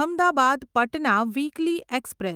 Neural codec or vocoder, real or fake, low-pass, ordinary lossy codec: autoencoder, 48 kHz, 128 numbers a frame, DAC-VAE, trained on Japanese speech; fake; 19.8 kHz; Opus, 32 kbps